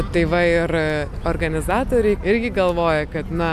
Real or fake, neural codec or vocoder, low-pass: real; none; 14.4 kHz